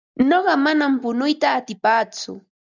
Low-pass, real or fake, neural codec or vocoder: 7.2 kHz; real; none